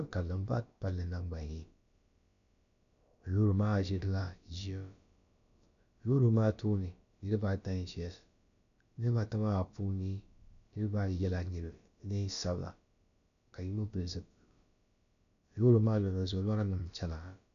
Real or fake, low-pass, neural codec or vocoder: fake; 7.2 kHz; codec, 16 kHz, about 1 kbps, DyCAST, with the encoder's durations